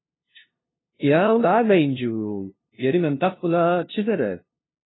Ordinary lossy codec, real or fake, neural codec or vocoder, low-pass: AAC, 16 kbps; fake; codec, 16 kHz, 0.5 kbps, FunCodec, trained on LibriTTS, 25 frames a second; 7.2 kHz